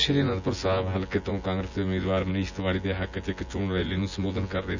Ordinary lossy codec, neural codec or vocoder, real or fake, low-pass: none; vocoder, 24 kHz, 100 mel bands, Vocos; fake; 7.2 kHz